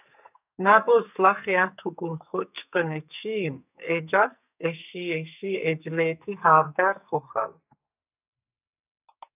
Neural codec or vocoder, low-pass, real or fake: codec, 44.1 kHz, 2.6 kbps, SNAC; 3.6 kHz; fake